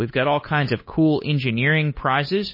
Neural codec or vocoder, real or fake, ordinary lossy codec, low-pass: codec, 16 kHz, 8 kbps, FunCodec, trained on Chinese and English, 25 frames a second; fake; MP3, 24 kbps; 5.4 kHz